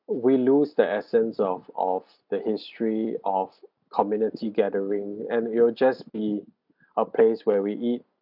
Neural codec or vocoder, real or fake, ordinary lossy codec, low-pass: none; real; none; 5.4 kHz